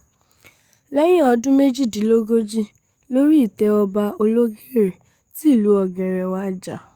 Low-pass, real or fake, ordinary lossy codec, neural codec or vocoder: 19.8 kHz; fake; Opus, 64 kbps; codec, 44.1 kHz, 7.8 kbps, DAC